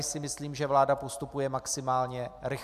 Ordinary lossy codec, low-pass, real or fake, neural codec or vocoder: MP3, 96 kbps; 14.4 kHz; real; none